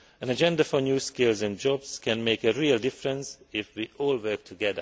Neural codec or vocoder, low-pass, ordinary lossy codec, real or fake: none; none; none; real